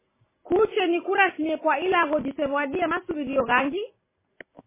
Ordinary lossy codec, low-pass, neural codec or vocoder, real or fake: MP3, 16 kbps; 3.6 kHz; none; real